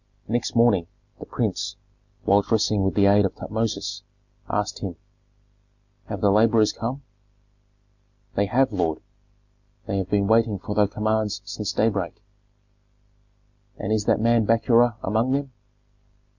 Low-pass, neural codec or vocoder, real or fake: 7.2 kHz; none; real